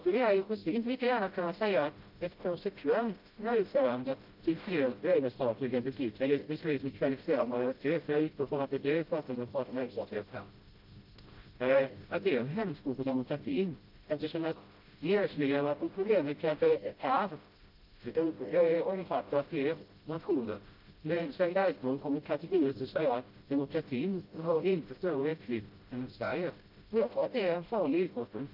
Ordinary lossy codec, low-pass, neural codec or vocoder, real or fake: Opus, 24 kbps; 5.4 kHz; codec, 16 kHz, 0.5 kbps, FreqCodec, smaller model; fake